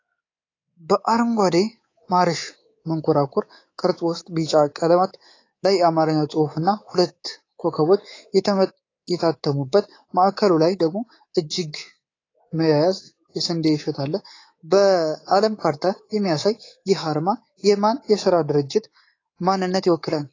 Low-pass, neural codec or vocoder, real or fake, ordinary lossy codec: 7.2 kHz; codec, 24 kHz, 3.1 kbps, DualCodec; fake; AAC, 32 kbps